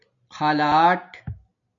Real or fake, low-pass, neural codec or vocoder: real; 7.2 kHz; none